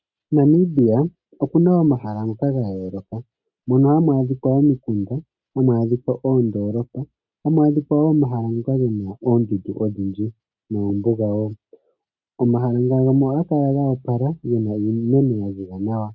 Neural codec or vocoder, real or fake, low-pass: none; real; 7.2 kHz